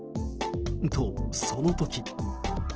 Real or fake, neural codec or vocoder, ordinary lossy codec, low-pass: real; none; Opus, 16 kbps; 7.2 kHz